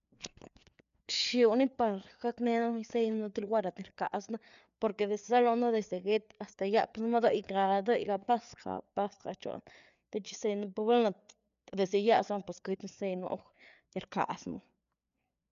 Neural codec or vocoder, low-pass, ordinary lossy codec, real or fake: codec, 16 kHz, 4 kbps, FreqCodec, larger model; 7.2 kHz; none; fake